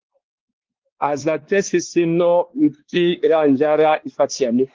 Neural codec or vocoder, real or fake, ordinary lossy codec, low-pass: codec, 16 kHz, 2 kbps, X-Codec, WavLM features, trained on Multilingual LibriSpeech; fake; Opus, 16 kbps; 7.2 kHz